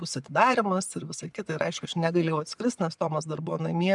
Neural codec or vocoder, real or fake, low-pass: vocoder, 24 kHz, 100 mel bands, Vocos; fake; 10.8 kHz